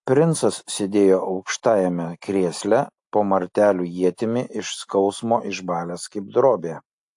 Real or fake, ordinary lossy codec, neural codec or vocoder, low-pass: real; AAC, 64 kbps; none; 10.8 kHz